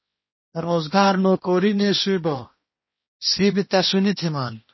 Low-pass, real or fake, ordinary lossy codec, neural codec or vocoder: 7.2 kHz; fake; MP3, 24 kbps; codec, 16 kHz, 1 kbps, X-Codec, HuBERT features, trained on balanced general audio